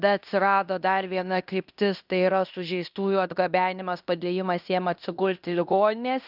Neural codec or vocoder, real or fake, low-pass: codec, 16 kHz in and 24 kHz out, 0.9 kbps, LongCat-Audio-Codec, fine tuned four codebook decoder; fake; 5.4 kHz